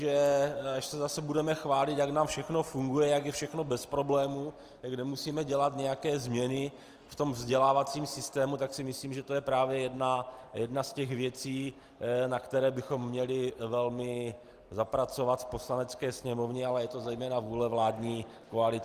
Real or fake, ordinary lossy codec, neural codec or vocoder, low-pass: real; Opus, 24 kbps; none; 14.4 kHz